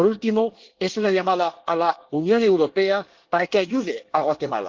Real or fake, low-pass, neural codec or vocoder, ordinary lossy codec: fake; 7.2 kHz; codec, 24 kHz, 1 kbps, SNAC; Opus, 16 kbps